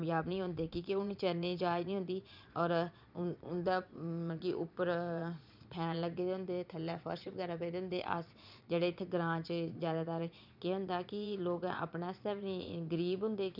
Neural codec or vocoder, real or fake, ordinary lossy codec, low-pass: vocoder, 22.05 kHz, 80 mel bands, Vocos; fake; none; 5.4 kHz